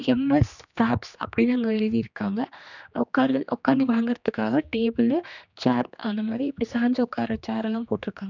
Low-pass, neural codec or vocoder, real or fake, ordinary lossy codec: 7.2 kHz; codec, 16 kHz, 2 kbps, X-Codec, HuBERT features, trained on general audio; fake; none